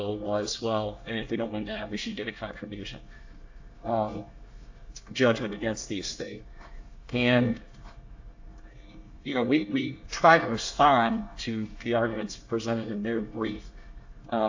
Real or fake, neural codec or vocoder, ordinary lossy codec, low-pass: fake; codec, 24 kHz, 1 kbps, SNAC; AAC, 48 kbps; 7.2 kHz